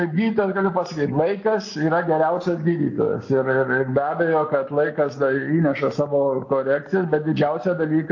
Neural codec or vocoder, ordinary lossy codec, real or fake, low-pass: none; AAC, 48 kbps; real; 7.2 kHz